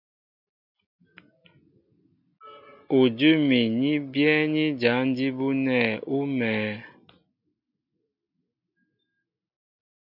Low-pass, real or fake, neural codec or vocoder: 5.4 kHz; real; none